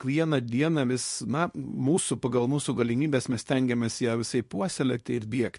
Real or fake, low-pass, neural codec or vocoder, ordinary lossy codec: fake; 10.8 kHz; codec, 24 kHz, 0.9 kbps, WavTokenizer, small release; MP3, 48 kbps